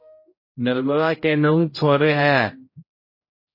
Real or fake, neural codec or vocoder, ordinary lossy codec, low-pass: fake; codec, 16 kHz, 0.5 kbps, X-Codec, HuBERT features, trained on general audio; MP3, 24 kbps; 5.4 kHz